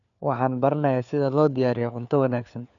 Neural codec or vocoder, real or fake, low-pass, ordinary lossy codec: codec, 16 kHz, 4 kbps, FunCodec, trained on LibriTTS, 50 frames a second; fake; 7.2 kHz; none